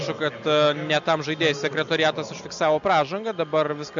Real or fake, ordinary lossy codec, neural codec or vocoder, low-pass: real; MP3, 96 kbps; none; 7.2 kHz